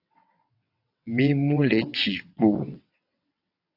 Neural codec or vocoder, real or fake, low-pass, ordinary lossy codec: vocoder, 22.05 kHz, 80 mel bands, WaveNeXt; fake; 5.4 kHz; AAC, 32 kbps